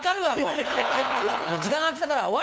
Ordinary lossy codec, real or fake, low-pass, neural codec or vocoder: none; fake; none; codec, 16 kHz, 2 kbps, FunCodec, trained on LibriTTS, 25 frames a second